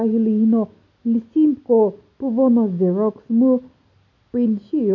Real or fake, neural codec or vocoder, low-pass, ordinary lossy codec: real; none; 7.2 kHz; none